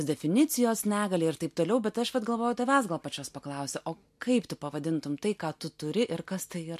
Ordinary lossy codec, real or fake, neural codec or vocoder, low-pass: MP3, 64 kbps; real; none; 14.4 kHz